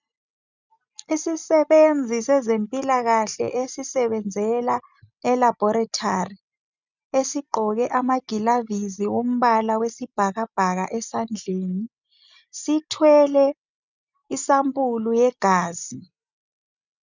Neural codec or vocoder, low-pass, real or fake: none; 7.2 kHz; real